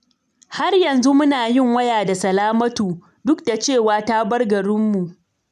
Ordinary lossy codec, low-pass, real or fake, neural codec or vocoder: none; 14.4 kHz; real; none